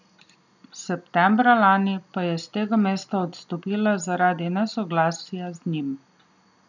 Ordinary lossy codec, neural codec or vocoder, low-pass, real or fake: none; none; 7.2 kHz; real